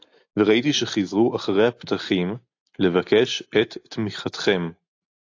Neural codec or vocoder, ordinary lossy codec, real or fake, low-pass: none; AAC, 48 kbps; real; 7.2 kHz